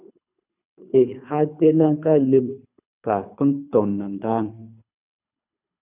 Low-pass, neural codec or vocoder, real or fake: 3.6 kHz; codec, 24 kHz, 3 kbps, HILCodec; fake